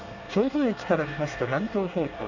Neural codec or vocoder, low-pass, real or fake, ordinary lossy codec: codec, 24 kHz, 1 kbps, SNAC; 7.2 kHz; fake; none